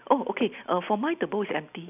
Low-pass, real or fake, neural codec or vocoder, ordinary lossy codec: 3.6 kHz; real; none; none